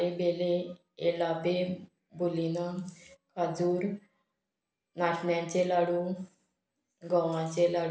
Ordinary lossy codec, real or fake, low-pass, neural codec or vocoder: none; real; none; none